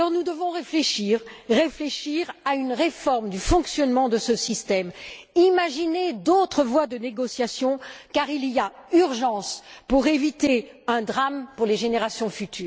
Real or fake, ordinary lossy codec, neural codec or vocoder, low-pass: real; none; none; none